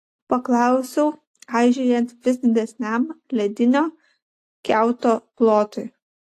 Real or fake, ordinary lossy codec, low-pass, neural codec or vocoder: real; AAC, 48 kbps; 14.4 kHz; none